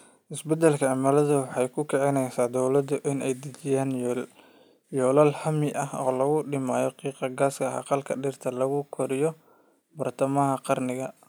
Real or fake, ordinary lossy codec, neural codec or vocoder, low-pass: real; none; none; none